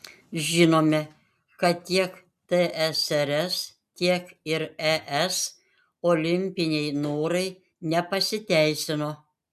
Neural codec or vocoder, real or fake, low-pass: none; real; 14.4 kHz